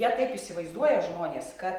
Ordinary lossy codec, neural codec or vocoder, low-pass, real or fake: Opus, 24 kbps; none; 19.8 kHz; real